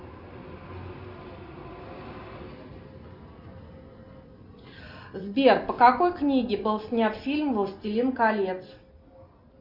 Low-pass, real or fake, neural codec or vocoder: 5.4 kHz; real; none